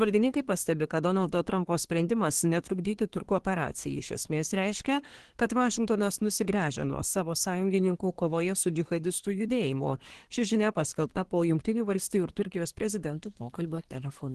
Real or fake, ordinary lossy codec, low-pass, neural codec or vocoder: fake; Opus, 16 kbps; 10.8 kHz; codec, 24 kHz, 1 kbps, SNAC